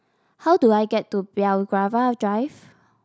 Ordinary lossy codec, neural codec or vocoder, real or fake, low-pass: none; none; real; none